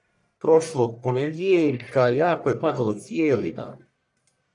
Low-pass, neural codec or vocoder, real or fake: 10.8 kHz; codec, 44.1 kHz, 1.7 kbps, Pupu-Codec; fake